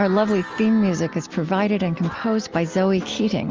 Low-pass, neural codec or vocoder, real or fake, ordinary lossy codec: 7.2 kHz; none; real; Opus, 24 kbps